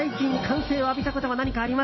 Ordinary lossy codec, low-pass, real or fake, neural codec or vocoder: MP3, 24 kbps; 7.2 kHz; real; none